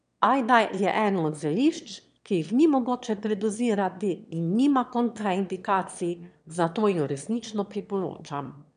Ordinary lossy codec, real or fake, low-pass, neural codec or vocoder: none; fake; 9.9 kHz; autoencoder, 22.05 kHz, a latent of 192 numbers a frame, VITS, trained on one speaker